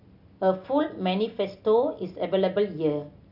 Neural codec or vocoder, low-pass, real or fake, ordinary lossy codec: none; 5.4 kHz; real; none